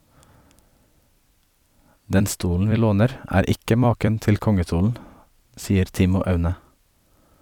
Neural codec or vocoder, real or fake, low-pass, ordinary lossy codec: vocoder, 44.1 kHz, 128 mel bands every 256 samples, BigVGAN v2; fake; 19.8 kHz; none